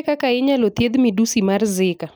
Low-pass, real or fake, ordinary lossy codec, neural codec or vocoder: none; real; none; none